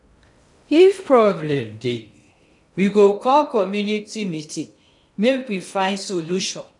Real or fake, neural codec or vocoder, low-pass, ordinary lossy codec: fake; codec, 16 kHz in and 24 kHz out, 0.6 kbps, FocalCodec, streaming, 2048 codes; 10.8 kHz; none